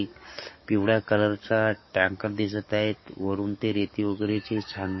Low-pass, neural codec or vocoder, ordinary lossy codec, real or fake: 7.2 kHz; codec, 44.1 kHz, 7.8 kbps, DAC; MP3, 24 kbps; fake